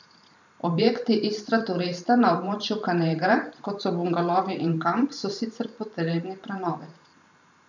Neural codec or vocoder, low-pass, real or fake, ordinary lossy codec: vocoder, 44.1 kHz, 128 mel bands every 512 samples, BigVGAN v2; 7.2 kHz; fake; none